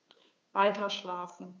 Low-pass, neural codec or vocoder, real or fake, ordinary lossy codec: none; codec, 16 kHz, 2 kbps, FunCodec, trained on Chinese and English, 25 frames a second; fake; none